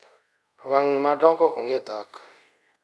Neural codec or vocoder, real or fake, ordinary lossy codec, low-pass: codec, 24 kHz, 0.5 kbps, DualCodec; fake; none; none